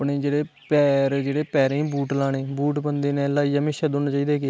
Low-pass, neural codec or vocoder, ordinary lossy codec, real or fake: none; none; none; real